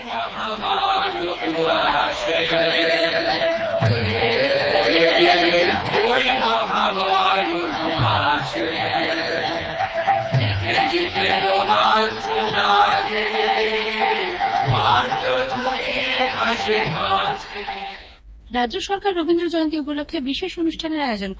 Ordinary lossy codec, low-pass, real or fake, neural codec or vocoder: none; none; fake; codec, 16 kHz, 2 kbps, FreqCodec, smaller model